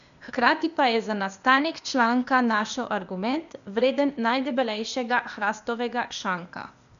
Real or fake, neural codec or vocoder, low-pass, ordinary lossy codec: fake; codec, 16 kHz, 0.8 kbps, ZipCodec; 7.2 kHz; MP3, 96 kbps